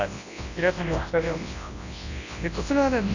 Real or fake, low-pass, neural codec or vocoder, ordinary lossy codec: fake; 7.2 kHz; codec, 24 kHz, 0.9 kbps, WavTokenizer, large speech release; none